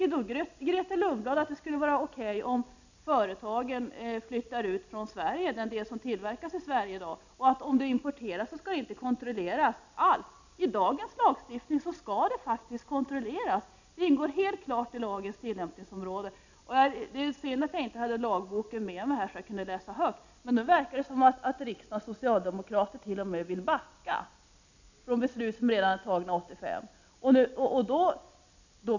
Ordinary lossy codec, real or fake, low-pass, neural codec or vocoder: none; real; 7.2 kHz; none